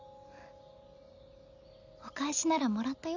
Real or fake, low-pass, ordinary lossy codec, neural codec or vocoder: real; 7.2 kHz; none; none